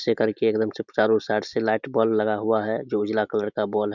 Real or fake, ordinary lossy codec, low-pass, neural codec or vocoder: real; none; 7.2 kHz; none